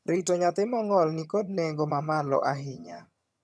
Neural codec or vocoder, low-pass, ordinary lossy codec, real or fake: vocoder, 22.05 kHz, 80 mel bands, HiFi-GAN; none; none; fake